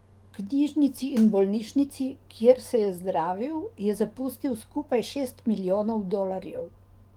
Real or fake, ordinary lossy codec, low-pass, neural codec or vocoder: fake; Opus, 24 kbps; 19.8 kHz; codec, 44.1 kHz, 7.8 kbps, DAC